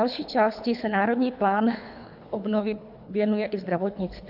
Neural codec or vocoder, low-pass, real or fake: codec, 24 kHz, 3 kbps, HILCodec; 5.4 kHz; fake